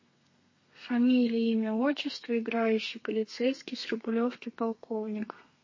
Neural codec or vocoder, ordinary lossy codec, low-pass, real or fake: codec, 32 kHz, 1.9 kbps, SNAC; MP3, 32 kbps; 7.2 kHz; fake